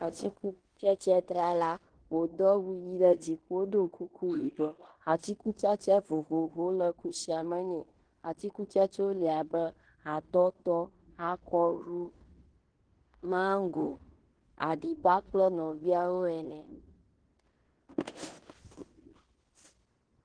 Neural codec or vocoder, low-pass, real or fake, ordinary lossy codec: codec, 16 kHz in and 24 kHz out, 0.9 kbps, LongCat-Audio-Codec, fine tuned four codebook decoder; 9.9 kHz; fake; Opus, 16 kbps